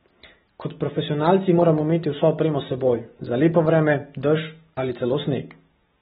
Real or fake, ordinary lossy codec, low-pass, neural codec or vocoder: real; AAC, 16 kbps; 9.9 kHz; none